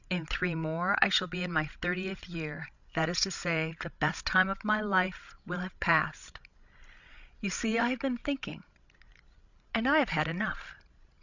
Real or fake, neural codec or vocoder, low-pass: fake; codec, 16 kHz, 16 kbps, FreqCodec, larger model; 7.2 kHz